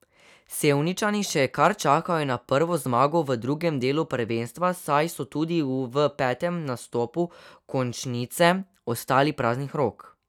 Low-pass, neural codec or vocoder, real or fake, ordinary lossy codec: 19.8 kHz; none; real; none